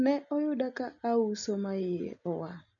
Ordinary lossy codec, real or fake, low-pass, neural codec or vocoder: none; real; 7.2 kHz; none